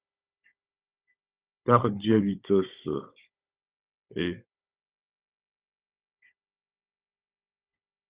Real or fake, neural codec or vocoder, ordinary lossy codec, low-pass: fake; codec, 16 kHz, 16 kbps, FunCodec, trained on Chinese and English, 50 frames a second; Opus, 64 kbps; 3.6 kHz